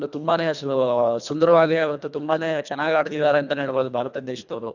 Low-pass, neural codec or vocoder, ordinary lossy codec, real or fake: 7.2 kHz; codec, 24 kHz, 1.5 kbps, HILCodec; none; fake